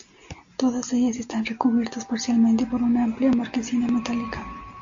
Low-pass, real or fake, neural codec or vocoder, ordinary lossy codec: 7.2 kHz; real; none; AAC, 64 kbps